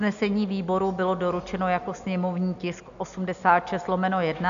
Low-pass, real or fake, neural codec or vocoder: 7.2 kHz; real; none